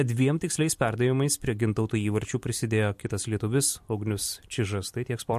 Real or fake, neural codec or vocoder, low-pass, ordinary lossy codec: fake; vocoder, 44.1 kHz, 128 mel bands every 256 samples, BigVGAN v2; 14.4 kHz; MP3, 64 kbps